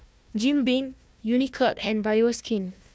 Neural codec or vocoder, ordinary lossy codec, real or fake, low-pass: codec, 16 kHz, 1 kbps, FunCodec, trained on Chinese and English, 50 frames a second; none; fake; none